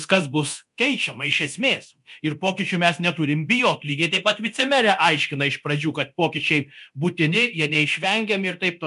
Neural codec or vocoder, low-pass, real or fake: codec, 24 kHz, 0.9 kbps, DualCodec; 10.8 kHz; fake